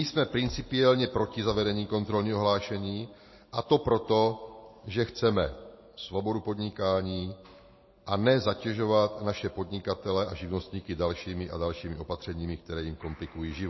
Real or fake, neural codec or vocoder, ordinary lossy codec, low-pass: real; none; MP3, 24 kbps; 7.2 kHz